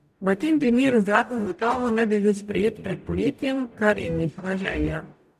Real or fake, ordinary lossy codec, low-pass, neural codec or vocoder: fake; none; 14.4 kHz; codec, 44.1 kHz, 0.9 kbps, DAC